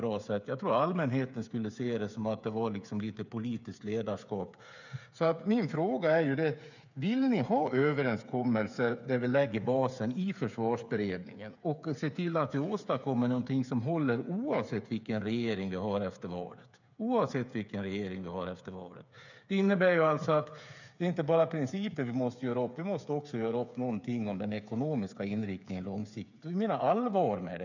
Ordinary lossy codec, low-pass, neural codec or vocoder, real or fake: none; 7.2 kHz; codec, 16 kHz, 8 kbps, FreqCodec, smaller model; fake